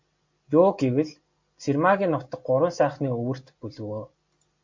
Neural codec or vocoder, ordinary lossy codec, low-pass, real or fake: none; MP3, 48 kbps; 7.2 kHz; real